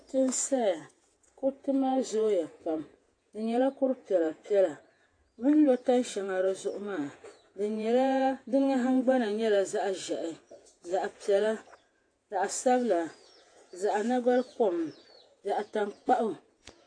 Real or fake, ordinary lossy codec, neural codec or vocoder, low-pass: fake; AAC, 48 kbps; vocoder, 48 kHz, 128 mel bands, Vocos; 9.9 kHz